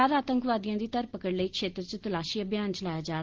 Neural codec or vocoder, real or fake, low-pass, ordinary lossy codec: none; real; 7.2 kHz; Opus, 16 kbps